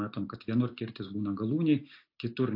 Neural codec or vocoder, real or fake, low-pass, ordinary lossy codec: none; real; 5.4 kHz; AAC, 32 kbps